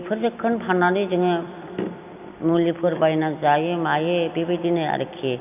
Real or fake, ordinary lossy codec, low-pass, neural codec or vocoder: fake; none; 3.6 kHz; vocoder, 44.1 kHz, 128 mel bands every 256 samples, BigVGAN v2